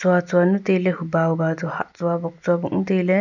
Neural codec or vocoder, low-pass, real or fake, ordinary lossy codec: none; 7.2 kHz; real; none